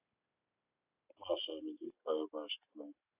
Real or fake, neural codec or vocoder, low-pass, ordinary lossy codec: real; none; 3.6 kHz; AAC, 32 kbps